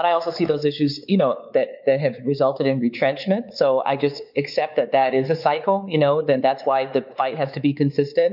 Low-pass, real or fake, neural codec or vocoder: 5.4 kHz; fake; codec, 16 kHz, 4 kbps, X-Codec, WavLM features, trained on Multilingual LibriSpeech